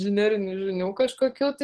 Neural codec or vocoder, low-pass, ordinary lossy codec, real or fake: codec, 44.1 kHz, 7.8 kbps, DAC; 10.8 kHz; Opus, 16 kbps; fake